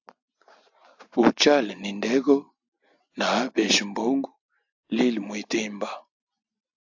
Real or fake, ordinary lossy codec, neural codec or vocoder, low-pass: real; AAC, 48 kbps; none; 7.2 kHz